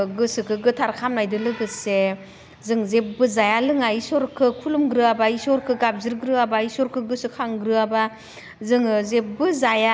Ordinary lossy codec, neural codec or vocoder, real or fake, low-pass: none; none; real; none